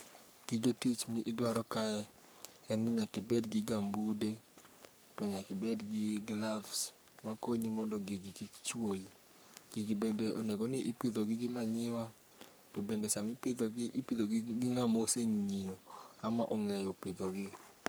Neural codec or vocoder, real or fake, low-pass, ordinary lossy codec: codec, 44.1 kHz, 3.4 kbps, Pupu-Codec; fake; none; none